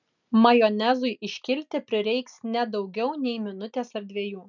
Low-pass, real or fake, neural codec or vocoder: 7.2 kHz; real; none